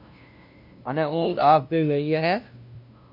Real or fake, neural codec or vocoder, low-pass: fake; codec, 16 kHz, 0.5 kbps, FunCodec, trained on LibriTTS, 25 frames a second; 5.4 kHz